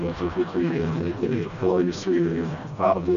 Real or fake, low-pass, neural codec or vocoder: fake; 7.2 kHz; codec, 16 kHz, 1 kbps, FreqCodec, smaller model